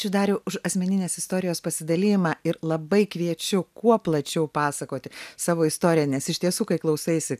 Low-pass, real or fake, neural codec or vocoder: 14.4 kHz; real; none